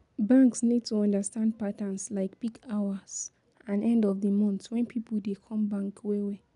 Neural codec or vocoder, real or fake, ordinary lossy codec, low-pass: none; real; none; 10.8 kHz